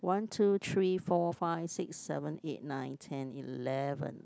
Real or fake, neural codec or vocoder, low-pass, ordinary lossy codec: real; none; none; none